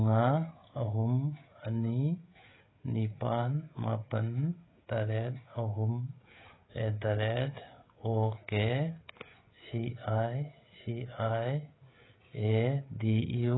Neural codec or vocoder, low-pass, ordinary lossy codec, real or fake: codec, 16 kHz, 16 kbps, FreqCodec, smaller model; 7.2 kHz; AAC, 16 kbps; fake